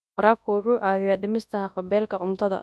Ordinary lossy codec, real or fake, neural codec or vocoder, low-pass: none; fake; codec, 24 kHz, 0.9 kbps, WavTokenizer, large speech release; none